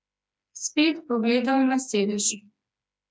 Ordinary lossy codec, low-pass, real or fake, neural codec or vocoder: none; none; fake; codec, 16 kHz, 2 kbps, FreqCodec, smaller model